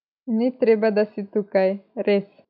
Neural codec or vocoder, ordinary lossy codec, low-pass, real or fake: none; none; 5.4 kHz; real